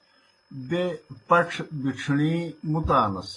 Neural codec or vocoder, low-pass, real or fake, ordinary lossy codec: none; 10.8 kHz; real; AAC, 32 kbps